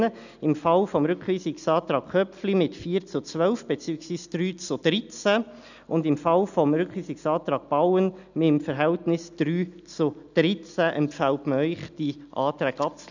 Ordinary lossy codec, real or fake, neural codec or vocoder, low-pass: none; real; none; 7.2 kHz